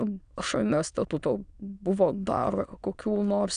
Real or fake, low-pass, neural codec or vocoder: fake; 9.9 kHz; autoencoder, 22.05 kHz, a latent of 192 numbers a frame, VITS, trained on many speakers